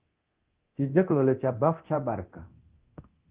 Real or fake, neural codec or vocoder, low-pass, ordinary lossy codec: fake; codec, 24 kHz, 0.9 kbps, DualCodec; 3.6 kHz; Opus, 16 kbps